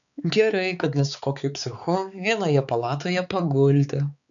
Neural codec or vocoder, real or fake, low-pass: codec, 16 kHz, 4 kbps, X-Codec, HuBERT features, trained on balanced general audio; fake; 7.2 kHz